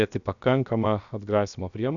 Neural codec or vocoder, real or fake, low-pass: codec, 16 kHz, 0.7 kbps, FocalCodec; fake; 7.2 kHz